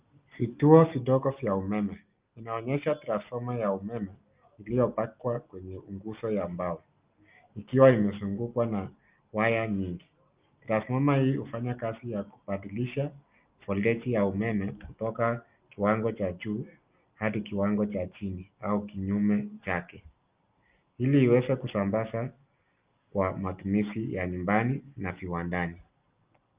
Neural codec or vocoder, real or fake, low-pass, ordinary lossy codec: none; real; 3.6 kHz; Opus, 24 kbps